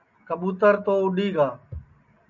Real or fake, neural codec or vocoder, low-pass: real; none; 7.2 kHz